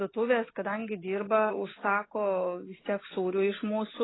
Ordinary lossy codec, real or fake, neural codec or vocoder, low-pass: AAC, 16 kbps; real; none; 7.2 kHz